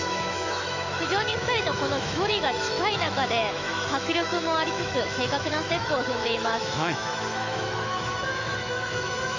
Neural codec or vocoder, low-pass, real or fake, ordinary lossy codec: autoencoder, 48 kHz, 128 numbers a frame, DAC-VAE, trained on Japanese speech; 7.2 kHz; fake; MP3, 64 kbps